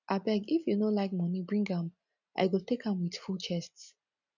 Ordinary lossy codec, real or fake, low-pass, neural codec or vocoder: none; real; 7.2 kHz; none